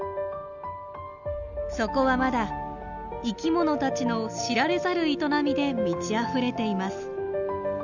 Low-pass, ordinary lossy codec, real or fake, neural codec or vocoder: 7.2 kHz; none; real; none